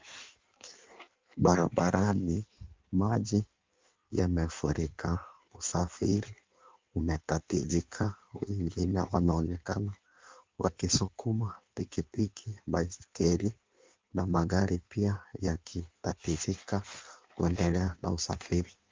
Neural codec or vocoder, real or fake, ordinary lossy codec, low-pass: codec, 16 kHz in and 24 kHz out, 1.1 kbps, FireRedTTS-2 codec; fake; Opus, 32 kbps; 7.2 kHz